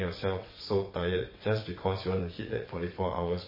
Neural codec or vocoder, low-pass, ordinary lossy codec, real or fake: vocoder, 22.05 kHz, 80 mel bands, WaveNeXt; 5.4 kHz; MP3, 24 kbps; fake